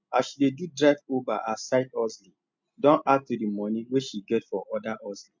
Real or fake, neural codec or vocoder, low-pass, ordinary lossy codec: real; none; 7.2 kHz; MP3, 64 kbps